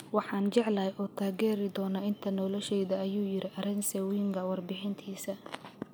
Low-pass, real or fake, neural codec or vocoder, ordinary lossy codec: none; real; none; none